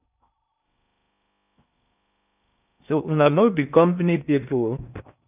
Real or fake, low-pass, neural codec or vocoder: fake; 3.6 kHz; codec, 16 kHz in and 24 kHz out, 0.6 kbps, FocalCodec, streaming, 4096 codes